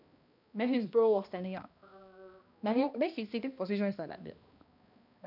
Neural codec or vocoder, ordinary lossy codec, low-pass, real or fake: codec, 16 kHz, 1 kbps, X-Codec, HuBERT features, trained on balanced general audio; none; 5.4 kHz; fake